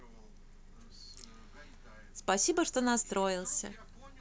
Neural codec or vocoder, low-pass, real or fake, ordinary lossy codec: none; none; real; none